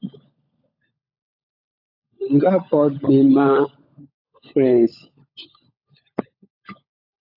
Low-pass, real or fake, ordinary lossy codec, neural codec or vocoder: 5.4 kHz; fake; AAC, 48 kbps; codec, 16 kHz, 16 kbps, FunCodec, trained on LibriTTS, 50 frames a second